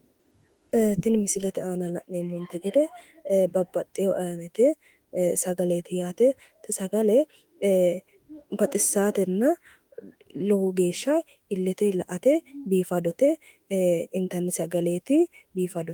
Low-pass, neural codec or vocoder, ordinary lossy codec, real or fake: 19.8 kHz; autoencoder, 48 kHz, 32 numbers a frame, DAC-VAE, trained on Japanese speech; Opus, 24 kbps; fake